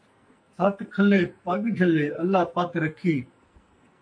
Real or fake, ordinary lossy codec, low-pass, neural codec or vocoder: fake; MP3, 48 kbps; 9.9 kHz; codec, 44.1 kHz, 2.6 kbps, SNAC